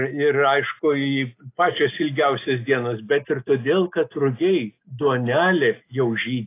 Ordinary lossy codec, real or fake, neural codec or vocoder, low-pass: AAC, 24 kbps; real; none; 3.6 kHz